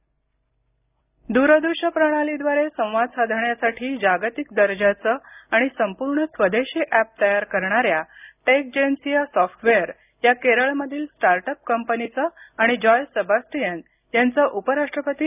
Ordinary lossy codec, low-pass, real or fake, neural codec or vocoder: none; 3.6 kHz; real; none